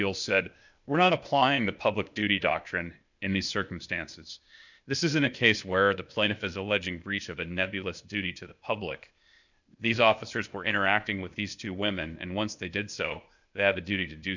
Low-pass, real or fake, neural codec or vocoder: 7.2 kHz; fake; codec, 16 kHz, 0.8 kbps, ZipCodec